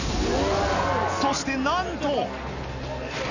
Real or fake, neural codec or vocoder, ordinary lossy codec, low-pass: real; none; none; 7.2 kHz